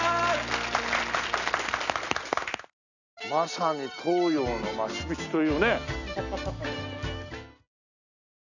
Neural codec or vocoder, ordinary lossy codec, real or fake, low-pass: none; none; real; 7.2 kHz